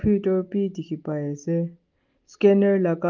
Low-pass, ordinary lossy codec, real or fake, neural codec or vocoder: 7.2 kHz; Opus, 32 kbps; real; none